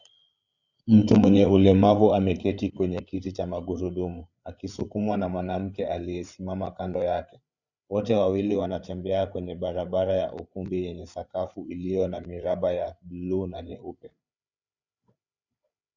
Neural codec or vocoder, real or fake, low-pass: codec, 16 kHz, 8 kbps, FreqCodec, larger model; fake; 7.2 kHz